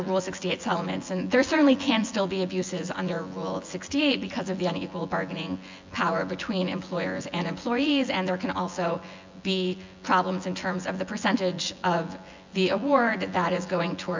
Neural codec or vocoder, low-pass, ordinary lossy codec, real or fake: vocoder, 24 kHz, 100 mel bands, Vocos; 7.2 kHz; MP3, 64 kbps; fake